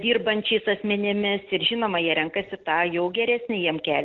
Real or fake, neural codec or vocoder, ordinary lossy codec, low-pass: real; none; Opus, 16 kbps; 7.2 kHz